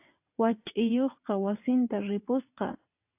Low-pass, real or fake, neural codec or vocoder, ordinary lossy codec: 3.6 kHz; fake; vocoder, 22.05 kHz, 80 mel bands, WaveNeXt; AAC, 32 kbps